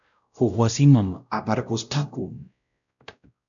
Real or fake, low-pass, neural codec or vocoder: fake; 7.2 kHz; codec, 16 kHz, 0.5 kbps, X-Codec, WavLM features, trained on Multilingual LibriSpeech